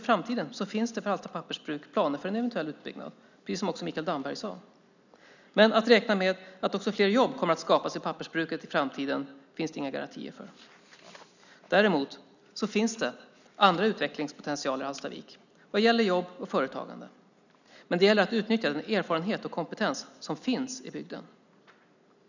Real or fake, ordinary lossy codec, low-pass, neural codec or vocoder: real; none; 7.2 kHz; none